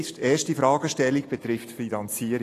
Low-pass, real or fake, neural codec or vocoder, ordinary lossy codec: 14.4 kHz; real; none; AAC, 48 kbps